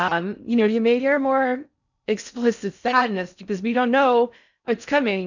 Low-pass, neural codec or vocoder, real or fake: 7.2 kHz; codec, 16 kHz in and 24 kHz out, 0.6 kbps, FocalCodec, streaming, 4096 codes; fake